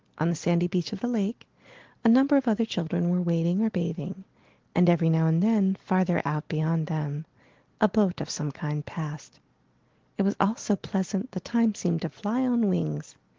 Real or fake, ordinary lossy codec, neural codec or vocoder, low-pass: real; Opus, 16 kbps; none; 7.2 kHz